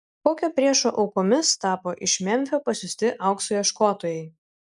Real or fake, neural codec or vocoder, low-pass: real; none; 10.8 kHz